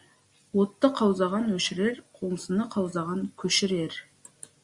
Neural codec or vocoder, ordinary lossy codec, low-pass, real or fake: none; Opus, 64 kbps; 10.8 kHz; real